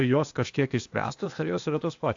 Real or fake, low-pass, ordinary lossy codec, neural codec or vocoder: fake; 7.2 kHz; AAC, 64 kbps; codec, 16 kHz, 0.8 kbps, ZipCodec